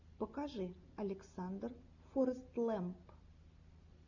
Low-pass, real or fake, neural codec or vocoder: 7.2 kHz; real; none